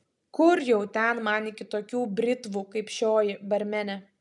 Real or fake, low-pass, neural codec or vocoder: real; 10.8 kHz; none